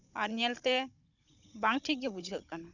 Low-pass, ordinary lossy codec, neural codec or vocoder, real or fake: 7.2 kHz; none; codec, 16 kHz, 16 kbps, FunCodec, trained on Chinese and English, 50 frames a second; fake